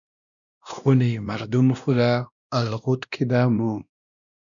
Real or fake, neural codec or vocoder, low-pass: fake; codec, 16 kHz, 1 kbps, X-Codec, WavLM features, trained on Multilingual LibriSpeech; 7.2 kHz